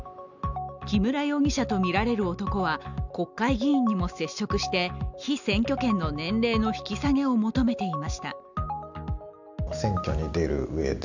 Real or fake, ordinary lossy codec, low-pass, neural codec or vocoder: real; none; 7.2 kHz; none